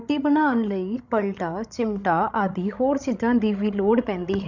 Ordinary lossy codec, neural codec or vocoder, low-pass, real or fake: none; codec, 16 kHz, 16 kbps, FreqCodec, larger model; 7.2 kHz; fake